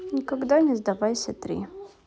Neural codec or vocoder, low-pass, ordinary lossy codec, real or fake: none; none; none; real